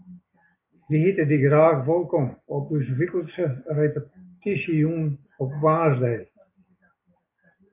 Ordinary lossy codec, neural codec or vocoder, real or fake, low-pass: AAC, 24 kbps; none; real; 3.6 kHz